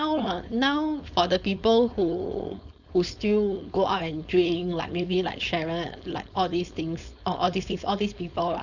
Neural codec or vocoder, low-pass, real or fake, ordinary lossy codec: codec, 16 kHz, 4.8 kbps, FACodec; 7.2 kHz; fake; none